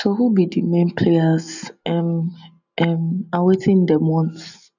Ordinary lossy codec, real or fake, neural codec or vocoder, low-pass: none; real; none; 7.2 kHz